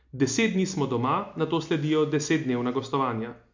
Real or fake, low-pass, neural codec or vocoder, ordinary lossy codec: real; 7.2 kHz; none; MP3, 64 kbps